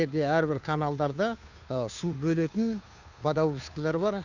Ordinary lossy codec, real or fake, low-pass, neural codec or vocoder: none; fake; 7.2 kHz; codec, 16 kHz, 2 kbps, FunCodec, trained on Chinese and English, 25 frames a second